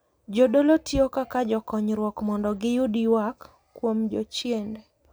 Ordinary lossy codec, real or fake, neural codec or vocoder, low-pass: none; real; none; none